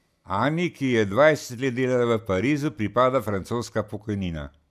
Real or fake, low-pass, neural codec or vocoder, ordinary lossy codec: real; 14.4 kHz; none; none